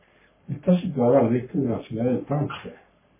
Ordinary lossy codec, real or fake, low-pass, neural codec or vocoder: MP3, 16 kbps; fake; 3.6 kHz; codec, 44.1 kHz, 3.4 kbps, Pupu-Codec